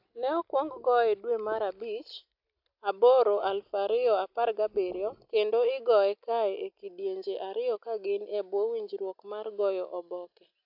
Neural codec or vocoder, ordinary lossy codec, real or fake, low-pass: none; AAC, 48 kbps; real; 5.4 kHz